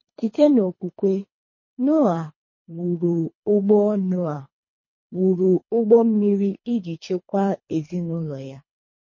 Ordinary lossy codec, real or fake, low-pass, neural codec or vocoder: MP3, 32 kbps; fake; 7.2 kHz; codec, 24 kHz, 3 kbps, HILCodec